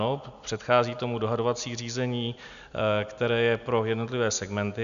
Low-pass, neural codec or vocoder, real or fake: 7.2 kHz; none; real